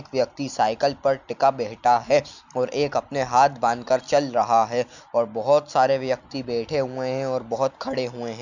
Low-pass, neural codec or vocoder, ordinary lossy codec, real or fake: 7.2 kHz; none; none; real